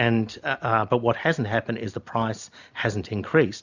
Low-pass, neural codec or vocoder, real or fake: 7.2 kHz; none; real